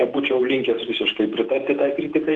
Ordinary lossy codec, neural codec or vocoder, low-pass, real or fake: Opus, 16 kbps; vocoder, 48 kHz, 128 mel bands, Vocos; 9.9 kHz; fake